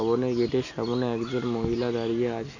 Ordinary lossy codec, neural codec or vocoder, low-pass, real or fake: none; none; 7.2 kHz; real